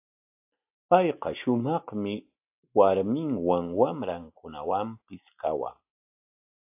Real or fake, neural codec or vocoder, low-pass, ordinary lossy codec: real; none; 3.6 kHz; AAC, 32 kbps